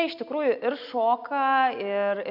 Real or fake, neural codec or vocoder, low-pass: real; none; 5.4 kHz